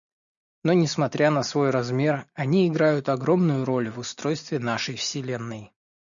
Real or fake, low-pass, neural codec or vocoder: real; 7.2 kHz; none